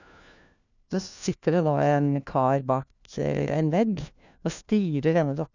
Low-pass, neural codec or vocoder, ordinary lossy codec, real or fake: 7.2 kHz; codec, 16 kHz, 1 kbps, FunCodec, trained on LibriTTS, 50 frames a second; none; fake